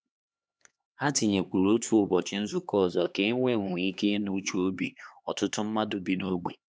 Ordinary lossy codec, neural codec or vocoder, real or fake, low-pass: none; codec, 16 kHz, 2 kbps, X-Codec, HuBERT features, trained on LibriSpeech; fake; none